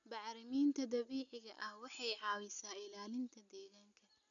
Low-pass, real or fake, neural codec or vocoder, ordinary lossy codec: 7.2 kHz; real; none; none